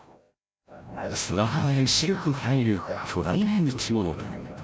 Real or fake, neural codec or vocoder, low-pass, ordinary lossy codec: fake; codec, 16 kHz, 0.5 kbps, FreqCodec, larger model; none; none